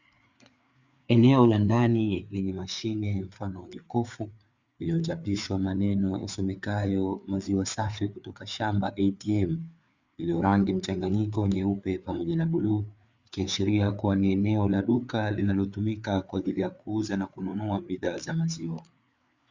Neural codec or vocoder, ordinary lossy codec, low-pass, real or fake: codec, 16 kHz, 4 kbps, FreqCodec, larger model; Opus, 64 kbps; 7.2 kHz; fake